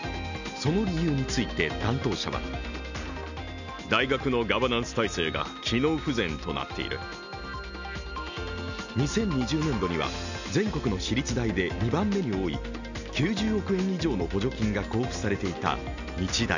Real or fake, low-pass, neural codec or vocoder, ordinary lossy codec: real; 7.2 kHz; none; none